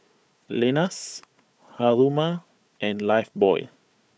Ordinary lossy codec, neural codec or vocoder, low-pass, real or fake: none; codec, 16 kHz, 4 kbps, FunCodec, trained on Chinese and English, 50 frames a second; none; fake